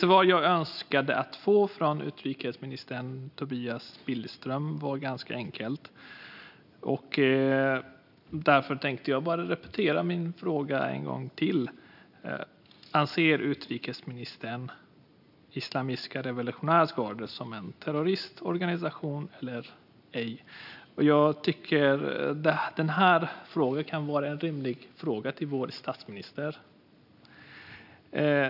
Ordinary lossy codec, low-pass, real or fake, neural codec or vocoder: none; 5.4 kHz; real; none